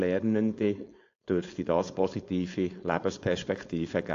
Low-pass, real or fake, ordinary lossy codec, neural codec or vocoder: 7.2 kHz; fake; AAC, 48 kbps; codec, 16 kHz, 4.8 kbps, FACodec